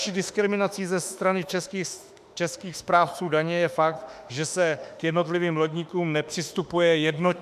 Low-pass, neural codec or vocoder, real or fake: 14.4 kHz; autoencoder, 48 kHz, 32 numbers a frame, DAC-VAE, trained on Japanese speech; fake